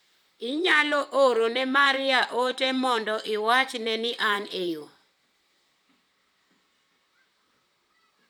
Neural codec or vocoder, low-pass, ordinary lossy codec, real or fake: vocoder, 44.1 kHz, 128 mel bands, Pupu-Vocoder; none; none; fake